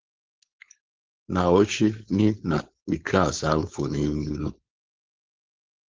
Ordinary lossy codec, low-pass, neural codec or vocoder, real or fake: Opus, 16 kbps; 7.2 kHz; codec, 16 kHz, 4.8 kbps, FACodec; fake